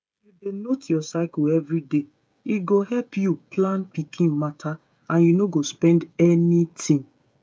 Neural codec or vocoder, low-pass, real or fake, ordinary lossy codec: codec, 16 kHz, 16 kbps, FreqCodec, smaller model; none; fake; none